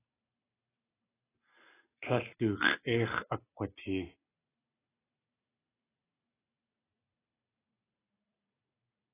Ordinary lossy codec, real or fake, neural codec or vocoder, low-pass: AAC, 16 kbps; real; none; 3.6 kHz